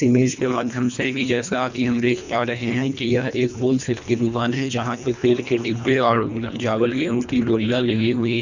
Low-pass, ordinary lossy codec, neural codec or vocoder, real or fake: 7.2 kHz; none; codec, 24 kHz, 1.5 kbps, HILCodec; fake